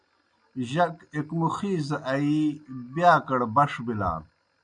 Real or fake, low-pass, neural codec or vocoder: real; 9.9 kHz; none